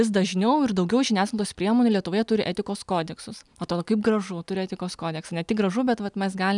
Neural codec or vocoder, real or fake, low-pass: none; real; 10.8 kHz